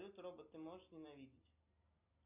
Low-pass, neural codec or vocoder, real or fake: 3.6 kHz; none; real